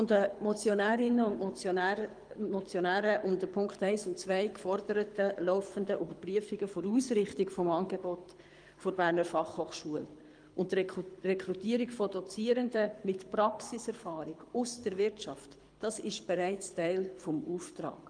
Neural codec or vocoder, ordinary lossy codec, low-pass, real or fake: codec, 24 kHz, 6 kbps, HILCodec; Opus, 32 kbps; 9.9 kHz; fake